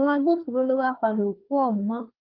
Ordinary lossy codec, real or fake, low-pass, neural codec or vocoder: Opus, 32 kbps; fake; 5.4 kHz; codec, 24 kHz, 1 kbps, SNAC